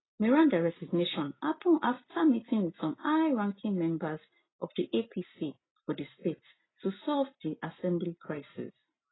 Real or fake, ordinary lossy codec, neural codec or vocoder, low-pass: real; AAC, 16 kbps; none; 7.2 kHz